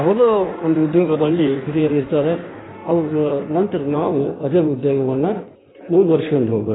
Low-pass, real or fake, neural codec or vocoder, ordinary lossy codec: 7.2 kHz; fake; codec, 16 kHz in and 24 kHz out, 1.1 kbps, FireRedTTS-2 codec; AAC, 16 kbps